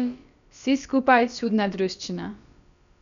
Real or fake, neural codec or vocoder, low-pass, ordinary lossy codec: fake; codec, 16 kHz, about 1 kbps, DyCAST, with the encoder's durations; 7.2 kHz; none